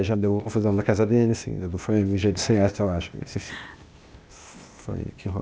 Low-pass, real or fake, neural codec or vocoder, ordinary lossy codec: none; fake; codec, 16 kHz, 0.8 kbps, ZipCodec; none